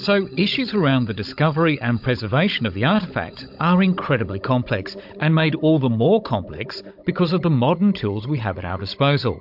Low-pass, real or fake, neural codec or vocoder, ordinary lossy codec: 5.4 kHz; fake; codec, 16 kHz, 16 kbps, FunCodec, trained on Chinese and English, 50 frames a second; MP3, 48 kbps